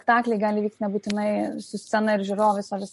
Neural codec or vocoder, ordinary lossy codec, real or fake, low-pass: none; MP3, 48 kbps; real; 14.4 kHz